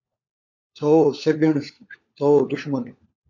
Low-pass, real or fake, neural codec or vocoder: 7.2 kHz; fake; codec, 16 kHz, 4 kbps, FunCodec, trained on LibriTTS, 50 frames a second